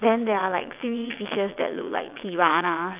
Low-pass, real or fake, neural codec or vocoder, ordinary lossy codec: 3.6 kHz; fake; vocoder, 22.05 kHz, 80 mel bands, WaveNeXt; none